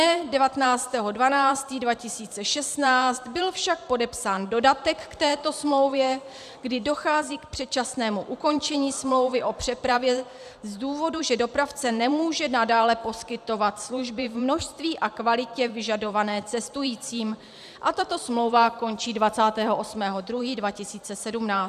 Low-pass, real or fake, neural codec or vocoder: 14.4 kHz; fake; vocoder, 44.1 kHz, 128 mel bands every 512 samples, BigVGAN v2